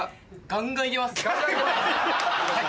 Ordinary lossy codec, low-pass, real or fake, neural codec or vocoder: none; none; real; none